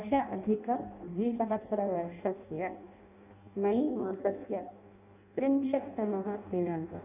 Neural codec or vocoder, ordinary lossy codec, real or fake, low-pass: codec, 16 kHz in and 24 kHz out, 0.6 kbps, FireRedTTS-2 codec; none; fake; 3.6 kHz